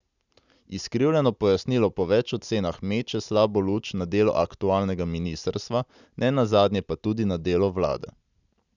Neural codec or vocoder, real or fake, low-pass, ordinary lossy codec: none; real; 7.2 kHz; none